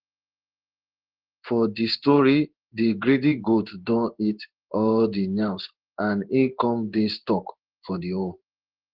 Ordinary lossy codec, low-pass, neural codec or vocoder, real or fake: Opus, 16 kbps; 5.4 kHz; codec, 16 kHz in and 24 kHz out, 1 kbps, XY-Tokenizer; fake